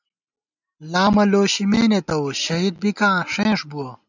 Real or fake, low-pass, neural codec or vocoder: real; 7.2 kHz; none